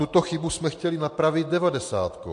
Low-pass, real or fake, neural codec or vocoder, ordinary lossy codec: 9.9 kHz; real; none; MP3, 48 kbps